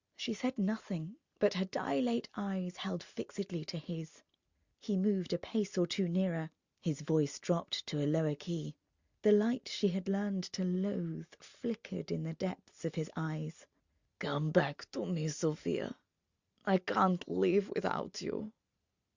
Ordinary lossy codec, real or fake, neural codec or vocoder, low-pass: Opus, 64 kbps; real; none; 7.2 kHz